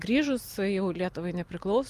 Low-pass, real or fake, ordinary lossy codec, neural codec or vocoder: 14.4 kHz; real; Opus, 32 kbps; none